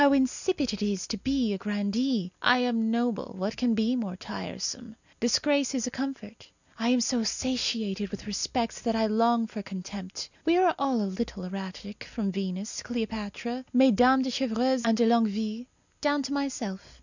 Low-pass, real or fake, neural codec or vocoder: 7.2 kHz; real; none